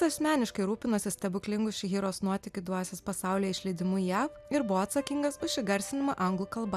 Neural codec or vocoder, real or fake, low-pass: none; real; 14.4 kHz